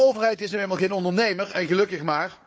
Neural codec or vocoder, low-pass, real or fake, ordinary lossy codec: codec, 16 kHz, 4 kbps, FunCodec, trained on Chinese and English, 50 frames a second; none; fake; none